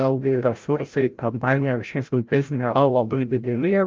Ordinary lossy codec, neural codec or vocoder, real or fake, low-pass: Opus, 24 kbps; codec, 16 kHz, 0.5 kbps, FreqCodec, larger model; fake; 7.2 kHz